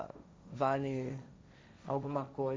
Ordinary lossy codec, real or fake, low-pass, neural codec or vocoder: none; fake; none; codec, 16 kHz, 1.1 kbps, Voila-Tokenizer